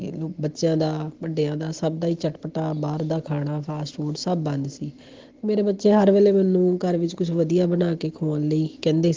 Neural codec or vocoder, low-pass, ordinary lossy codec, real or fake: none; 7.2 kHz; Opus, 16 kbps; real